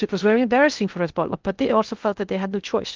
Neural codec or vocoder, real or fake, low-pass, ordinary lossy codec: codec, 16 kHz in and 24 kHz out, 0.6 kbps, FocalCodec, streaming, 2048 codes; fake; 7.2 kHz; Opus, 32 kbps